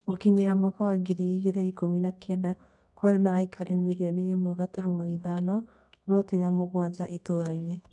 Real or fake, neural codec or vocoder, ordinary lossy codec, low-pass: fake; codec, 24 kHz, 0.9 kbps, WavTokenizer, medium music audio release; none; 10.8 kHz